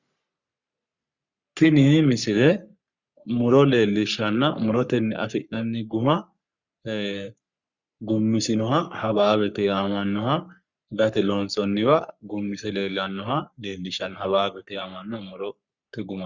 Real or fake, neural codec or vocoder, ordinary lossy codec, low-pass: fake; codec, 44.1 kHz, 3.4 kbps, Pupu-Codec; Opus, 64 kbps; 7.2 kHz